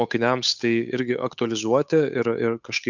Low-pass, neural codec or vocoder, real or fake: 7.2 kHz; codec, 16 kHz, 8 kbps, FunCodec, trained on Chinese and English, 25 frames a second; fake